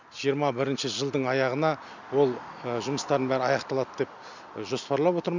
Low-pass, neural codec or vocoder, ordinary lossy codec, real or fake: 7.2 kHz; none; none; real